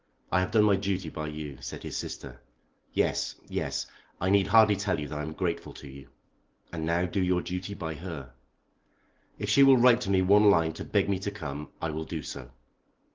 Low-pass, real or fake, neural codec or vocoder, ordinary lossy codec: 7.2 kHz; real; none; Opus, 16 kbps